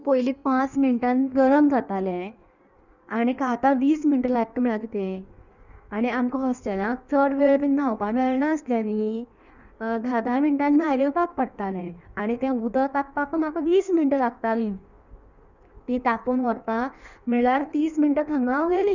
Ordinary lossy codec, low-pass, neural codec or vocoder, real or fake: none; 7.2 kHz; codec, 16 kHz in and 24 kHz out, 1.1 kbps, FireRedTTS-2 codec; fake